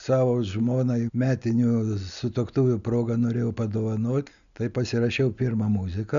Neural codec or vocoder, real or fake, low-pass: none; real; 7.2 kHz